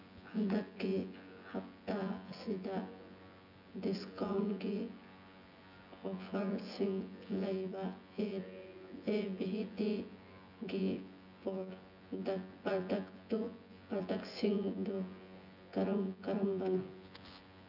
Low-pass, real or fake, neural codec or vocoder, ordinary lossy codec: 5.4 kHz; fake; vocoder, 24 kHz, 100 mel bands, Vocos; none